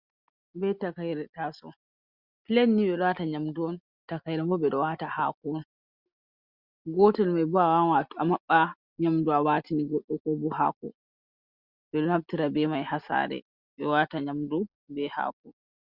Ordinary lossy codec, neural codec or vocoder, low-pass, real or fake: Opus, 64 kbps; none; 5.4 kHz; real